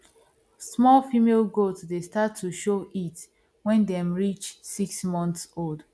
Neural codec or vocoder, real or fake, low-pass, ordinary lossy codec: none; real; none; none